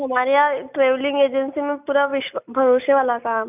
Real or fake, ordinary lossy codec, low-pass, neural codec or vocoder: real; none; 3.6 kHz; none